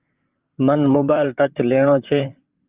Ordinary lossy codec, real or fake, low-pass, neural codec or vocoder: Opus, 32 kbps; fake; 3.6 kHz; vocoder, 44.1 kHz, 128 mel bands, Pupu-Vocoder